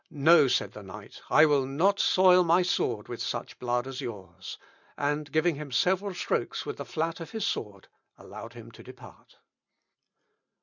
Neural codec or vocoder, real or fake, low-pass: none; real; 7.2 kHz